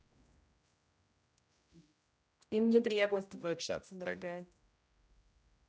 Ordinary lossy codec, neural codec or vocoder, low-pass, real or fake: none; codec, 16 kHz, 0.5 kbps, X-Codec, HuBERT features, trained on general audio; none; fake